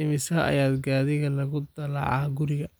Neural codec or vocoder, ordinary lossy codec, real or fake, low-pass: none; none; real; none